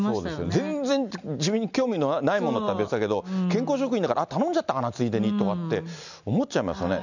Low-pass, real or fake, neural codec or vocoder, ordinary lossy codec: 7.2 kHz; real; none; none